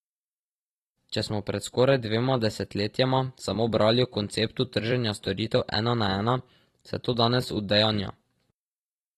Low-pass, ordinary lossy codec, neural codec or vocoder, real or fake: 19.8 kHz; AAC, 32 kbps; vocoder, 44.1 kHz, 128 mel bands every 256 samples, BigVGAN v2; fake